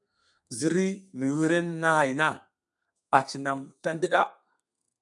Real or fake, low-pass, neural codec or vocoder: fake; 10.8 kHz; codec, 32 kHz, 1.9 kbps, SNAC